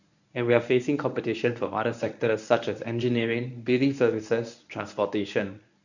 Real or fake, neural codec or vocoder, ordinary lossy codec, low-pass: fake; codec, 24 kHz, 0.9 kbps, WavTokenizer, medium speech release version 1; none; 7.2 kHz